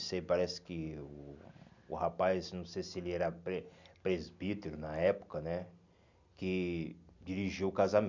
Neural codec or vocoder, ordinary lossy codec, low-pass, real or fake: none; none; 7.2 kHz; real